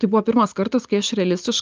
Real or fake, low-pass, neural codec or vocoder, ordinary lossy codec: real; 7.2 kHz; none; Opus, 24 kbps